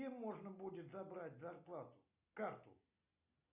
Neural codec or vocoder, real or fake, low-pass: none; real; 3.6 kHz